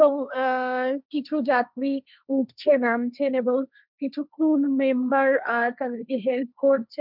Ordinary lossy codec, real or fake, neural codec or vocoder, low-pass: none; fake; codec, 16 kHz, 1.1 kbps, Voila-Tokenizer; 5.4 kHz